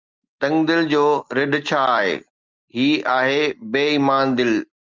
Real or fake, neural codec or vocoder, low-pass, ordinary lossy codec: real; none; 7.2 kHz; Opus, 32 kbps